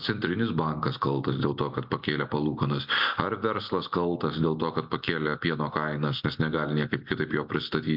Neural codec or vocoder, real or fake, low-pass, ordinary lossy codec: none; real; 5.4 kHz; MP3, 48 kbps